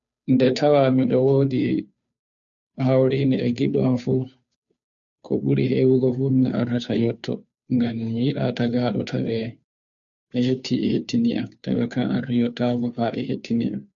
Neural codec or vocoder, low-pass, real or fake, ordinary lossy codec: codec, 16 kHz, 2 kbps, FunCodec, trained on Chinese and English, 25 frames a second; 7.2 kHz; fake; none